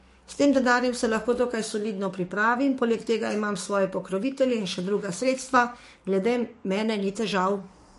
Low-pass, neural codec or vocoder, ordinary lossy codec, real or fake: 14.4 kHz; codec, 44.1 kHz, 7.8 kbps, DAC; MP3, 48 kbps; fake